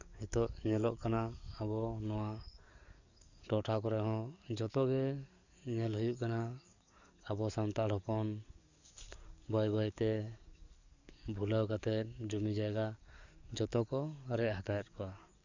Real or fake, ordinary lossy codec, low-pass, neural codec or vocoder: fake; Opus, 64 kbps; 7.2 kHz; codec, 44.1 kHz, 7.8 kbps, DAC